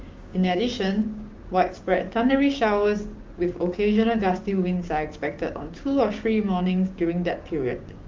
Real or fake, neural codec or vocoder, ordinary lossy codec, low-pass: fake; codec, 16 kHz, 6 kbps, DAC; Opus, 32 kbps; 7.2 kHz